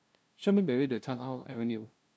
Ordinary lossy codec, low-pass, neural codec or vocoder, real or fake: none; none; codec, 16 kHz, 0.5 kbps, FunCodec, trained on LibriTTS, 25 frames a second; fake